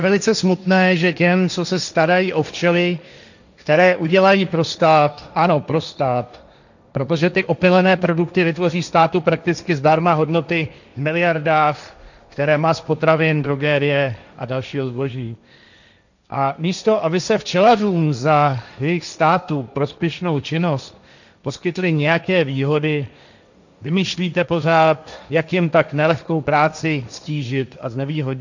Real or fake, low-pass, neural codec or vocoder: fake; 7.2 kHz; codec, 16 kHz, 1.1 kbps, Voila-Tokenizer